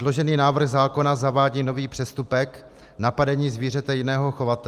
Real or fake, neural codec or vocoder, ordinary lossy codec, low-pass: real; none; Opus, 32 kbps; 14.4 kHz